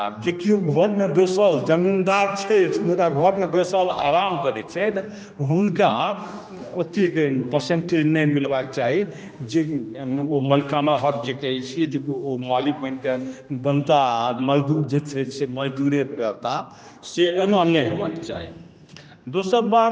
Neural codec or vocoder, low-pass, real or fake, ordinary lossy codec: codec, 16 kHz, 1 kbps, X-Codec, HuBERT features, trained on general audio; none; fake; none